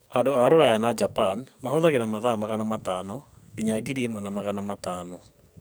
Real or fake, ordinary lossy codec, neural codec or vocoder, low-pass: fake; none; codec, 44.1 kHz, 2.6 kbps, SNAC; none